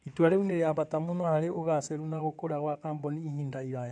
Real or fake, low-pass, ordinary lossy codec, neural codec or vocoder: fake; 9.9 kHz; none; codec, 16 kHz in and 24 kHz out, 2.2 kbps, FireRedTTS-2 codec